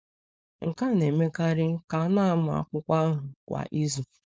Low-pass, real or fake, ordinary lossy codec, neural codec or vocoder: none; fake; none; codec, 16 kHz, 4.8 kbps, FACodec